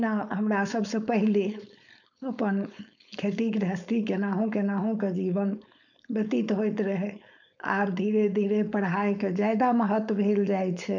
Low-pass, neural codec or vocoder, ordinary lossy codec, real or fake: 7.2 kHz; codec, 16 kHz, 4.8 kbps, FACodec; none; fake